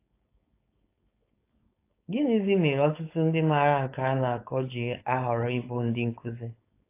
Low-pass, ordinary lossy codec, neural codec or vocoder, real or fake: 3.6 kHz; AAC, 24 kbps; codec, 16 kHz, 4.8 kbps, FACodec; fake